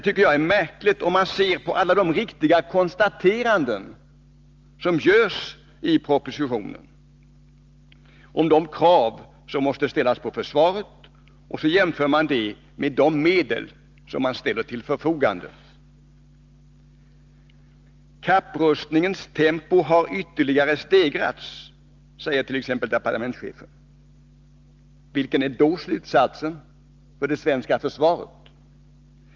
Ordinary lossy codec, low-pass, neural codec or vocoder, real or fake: Opus, 24 kbps; 7.2 kHz; none; real